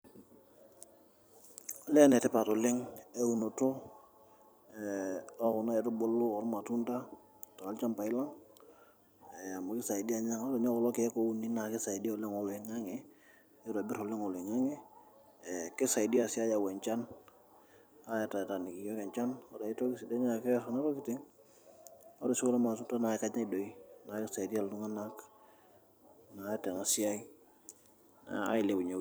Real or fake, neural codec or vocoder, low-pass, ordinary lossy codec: fake; vocoder, 44.1 kHz, 128 mel bands every 256 samples, BigVGAN v2; none; none